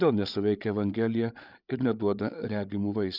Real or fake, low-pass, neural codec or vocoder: fake; 5.4 kHz; codec, 16 kHz, 8 kbps, FreqCodec, larger model